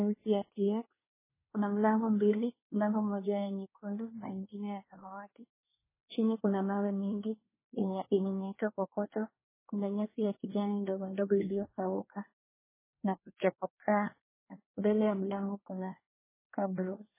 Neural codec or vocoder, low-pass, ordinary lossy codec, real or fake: codec, 24 kHz, 1 kbps, SNAC; 3.6 kHz; MP3, 16 kbps; fake